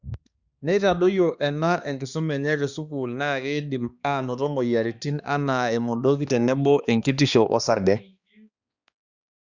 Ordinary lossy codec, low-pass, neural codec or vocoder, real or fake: Opus, 64 kbps; 7.2 kHz; codec, 16 kHz, 2 kbps, X-Codec, HuBERT features, trained on balanced general audio; fake